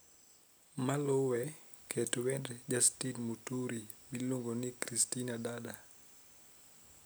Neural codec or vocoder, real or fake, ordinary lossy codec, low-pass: none; real; none; none